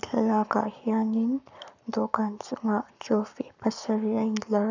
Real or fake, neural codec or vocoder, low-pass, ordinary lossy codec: fake; codec, 44.1 kHz, 7.8 kbps, Pupu-Codec; 7.2 kHz; none